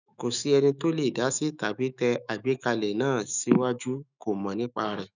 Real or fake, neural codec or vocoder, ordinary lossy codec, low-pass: fake; autoencoder, 48 kHz, 128 numbers a frame, DAC-VAE, trained on Japanese speech; none; 7.2 kHz